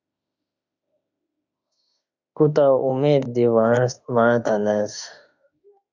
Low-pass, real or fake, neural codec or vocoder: 7.2 kHz; fake; autoencoder, 48 kHz, 32 numbers a frame, DAC-VAE, trained on Japanese speech